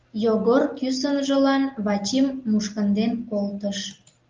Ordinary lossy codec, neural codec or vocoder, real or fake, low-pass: Opus, 24 kbps; none; real; 7.2 kHz